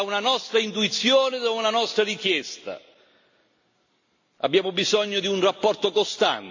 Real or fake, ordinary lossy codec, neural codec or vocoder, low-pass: real; AAC, 48 kbps; none; 7.2 kHz